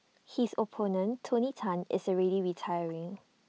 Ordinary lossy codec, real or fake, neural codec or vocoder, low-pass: none; real; none; none